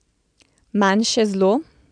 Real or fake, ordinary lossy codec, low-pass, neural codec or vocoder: real; none; 9.9 kHz; none